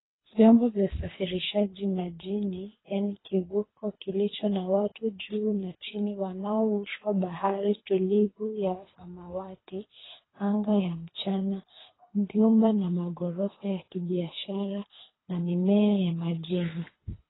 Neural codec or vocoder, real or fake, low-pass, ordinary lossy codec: codec, 24 kHz, 3 kbps, HILCodec; fake; 7.2 kHz; AAC, 16 kbps